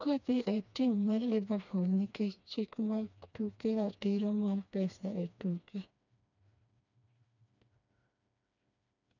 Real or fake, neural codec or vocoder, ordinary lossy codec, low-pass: fake; codec, 16 kHz, 2 kbps, FreqCodec, smaller model; none; 7.2 kHz